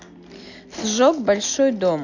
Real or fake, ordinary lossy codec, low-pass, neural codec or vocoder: real; AAC, 48 kbps; 7.2 kHz; none